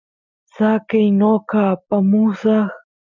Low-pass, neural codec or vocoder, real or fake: 7.2 kHz; none; real